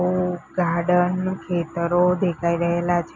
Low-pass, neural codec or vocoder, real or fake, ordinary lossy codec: 7.2 kHz; none; real; none